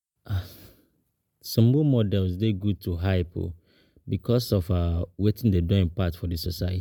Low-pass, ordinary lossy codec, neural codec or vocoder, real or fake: 19.8 kHz; MP3, 96 kbps; none; real